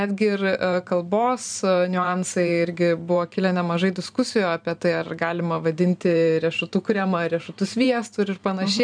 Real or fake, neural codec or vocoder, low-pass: fake; vocoder, 44.1 kHz, 128 mel bands every 512 samples, BigVGAN v2; 9.9 kHz